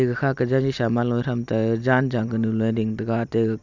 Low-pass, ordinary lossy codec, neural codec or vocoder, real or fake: 7.2 kHz; none; none; real